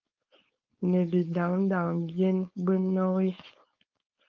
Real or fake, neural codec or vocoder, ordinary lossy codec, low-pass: fake; codec, 16 kHz, 4.8 kbps, FACodec; Opus, 24 kbps; 7.2 kHz